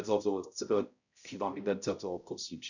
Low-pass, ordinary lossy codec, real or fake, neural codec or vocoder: 7.2 kHz; none; fake; codec, 16 kHz, 0.5 kbps, X-Codec, HuBERT features, trained on balanced general audio